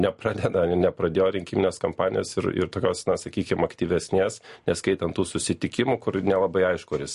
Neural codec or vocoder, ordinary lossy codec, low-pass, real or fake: none; MP3, 48 kbps; 14.4 kHz; real